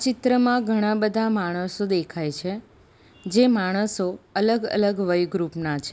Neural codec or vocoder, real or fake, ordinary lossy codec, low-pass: none; real; none; none